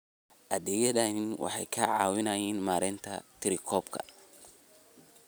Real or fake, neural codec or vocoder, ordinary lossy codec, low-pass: real; none; none; none